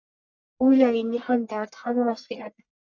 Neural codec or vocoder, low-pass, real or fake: codec, 44.1 kHz, 1.7 kbps, Pupu-Codec; 7.2 kHz; fake